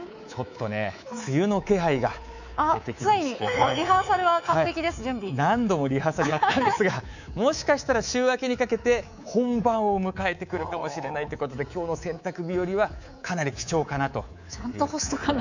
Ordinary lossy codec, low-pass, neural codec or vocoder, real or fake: none; 7.2 kHz; codec, 24 kHz, 3.1 kbps, DualCodec; fake